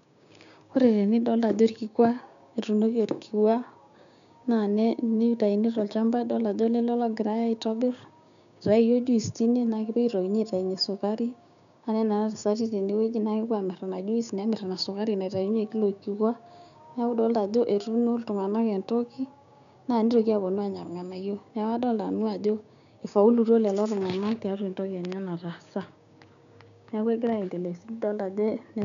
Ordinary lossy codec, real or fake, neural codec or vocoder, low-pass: none; fake; codec, 16 kHz, 6 kbps, DAC; 7.2 kHz